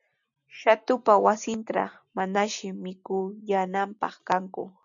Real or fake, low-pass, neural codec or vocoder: real; 7.2 kHz; none